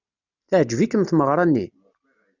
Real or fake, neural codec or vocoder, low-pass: real; none; 7.2 kHz